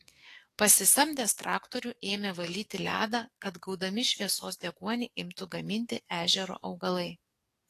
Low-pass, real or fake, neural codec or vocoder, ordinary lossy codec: 14.4 kHz; fake; codec, 44.1 kHz, 7.8 kbps, DAC; AAC, 48 kbps